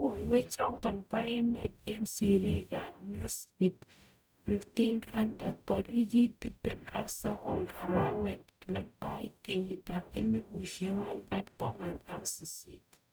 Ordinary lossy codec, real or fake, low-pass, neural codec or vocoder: none; fake; none; codec, 44.1 kHz, 0.9 kbps, DAC